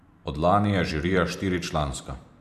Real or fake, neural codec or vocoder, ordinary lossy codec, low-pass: fake; vocoder, 44.1 kHz, 128 mel bands every 512 samples, BigVGAN v2; none; 14.4 kHz